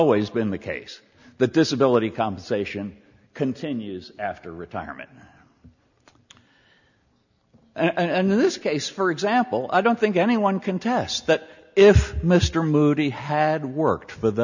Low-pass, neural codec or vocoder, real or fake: 7.2 kHz; none; real